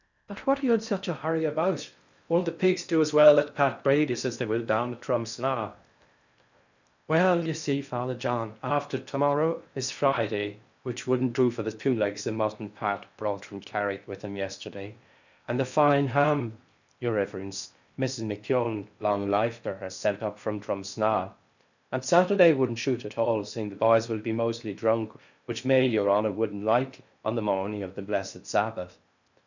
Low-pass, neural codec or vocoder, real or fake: 7.2 kHz; codec, 16 kHz in and 24 kHz out, 0.6 kbps, FocalCodec, streaming, 4096 codes; fake